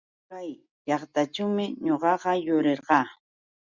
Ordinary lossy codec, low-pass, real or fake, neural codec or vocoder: Opus, 64 kbps; 7.2 kHz; real; none